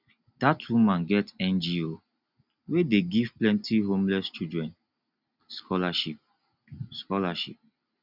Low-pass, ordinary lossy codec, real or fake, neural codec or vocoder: 5.4 kHz; none; real; none